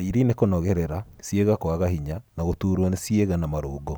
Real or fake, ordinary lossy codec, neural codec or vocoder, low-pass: real; none; none; none